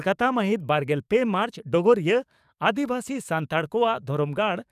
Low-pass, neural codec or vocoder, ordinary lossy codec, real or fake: 14.4 kHz; codec, 44.1 kHz, 7.8 kbps, DAC; none; fake